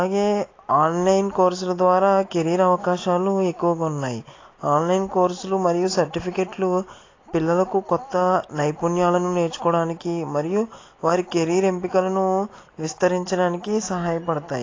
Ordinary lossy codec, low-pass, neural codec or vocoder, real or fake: AAC, 32 kbps; 7.2 kHz; none; real